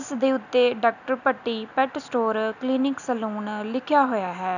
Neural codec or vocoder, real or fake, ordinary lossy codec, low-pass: none; real; none; 7.2 kHz